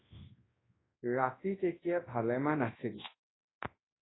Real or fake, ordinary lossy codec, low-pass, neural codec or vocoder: fake; AAC, 16 kbps; 7.2 kHz; codec, 24 kHz, 0.9 kbps, WavTokenizer, large speech release